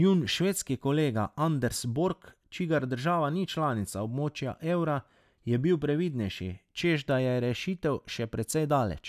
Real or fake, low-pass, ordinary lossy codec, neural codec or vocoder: real; 14.4 kHz; none; none